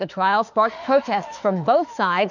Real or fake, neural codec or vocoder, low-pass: fake; autoencoder, 48 kHz, 32 numbers a frame, DAC-VAE, trained on Japanese speech; 7.2 kHz